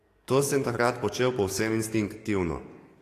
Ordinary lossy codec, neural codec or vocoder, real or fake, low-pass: AAC, 48 kbps; codec, 44.1 kHz, 7.8 kbps, DAC; fake; 14.4 kHz